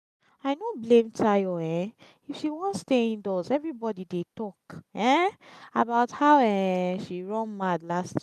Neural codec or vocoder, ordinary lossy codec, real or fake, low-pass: none; none; real; 14.4 kHz